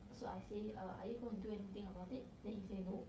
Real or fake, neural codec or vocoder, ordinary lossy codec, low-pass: fake; codec, 16 kHz, 16 kbps, FreqCodec, smaller model; none; none